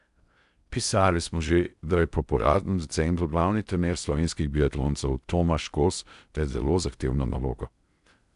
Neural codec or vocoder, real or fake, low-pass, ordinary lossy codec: codec, 16 kHz in and 24 kHz out, 0.6 kbps, FocalCodec, streaming, 4096 codes; fake; 10.8 kHz; none